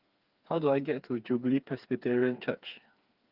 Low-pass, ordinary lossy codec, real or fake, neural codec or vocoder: 5.4 kHz; Opus, 32 kbps; fake; codec, 16 kHz, 4 kbps, FreqCodec, smaller model